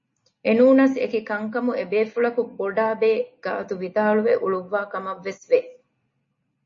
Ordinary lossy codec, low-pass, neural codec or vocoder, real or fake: MP3, 32 kbps; 7.2 kHz; none; real